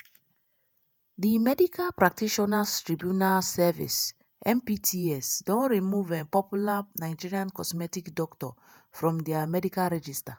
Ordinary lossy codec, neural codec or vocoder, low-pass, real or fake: none; vocoder, 48 kHz, 128 mel bands, Vocos; none; fake